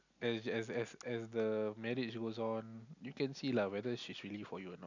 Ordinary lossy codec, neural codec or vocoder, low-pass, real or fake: none; none; 7.2 kHz; real